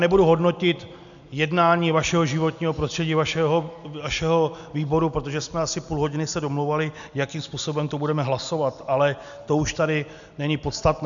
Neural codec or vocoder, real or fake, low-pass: none; real; 7.2 kHz